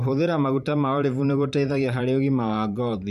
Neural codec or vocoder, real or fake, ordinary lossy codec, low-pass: vocoder, 44.1 kHz, 128 mel bands every 512 samples, BigVGAN v2; fake; MP3, 96 kbps; 14.4 kHz